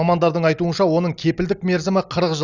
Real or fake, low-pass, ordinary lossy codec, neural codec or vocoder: real; 7.2 kHz; Opus, 64 kbps; none